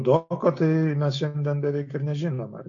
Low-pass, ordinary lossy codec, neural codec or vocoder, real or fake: 7.2 kHz; AAC, 48 kbps; none; real